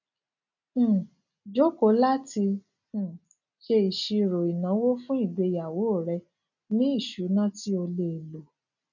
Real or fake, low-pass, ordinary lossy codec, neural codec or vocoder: real; 7.2 kHz; none; none